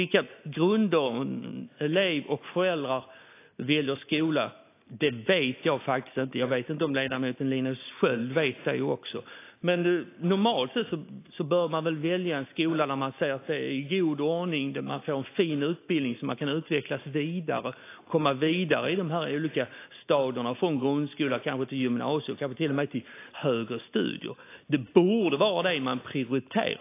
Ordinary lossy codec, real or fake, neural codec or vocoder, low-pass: AAC, 24 kbps; real; none; 3.6 kHz